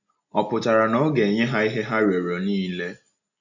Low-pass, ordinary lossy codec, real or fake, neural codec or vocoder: 7.2 kHz; none; real; none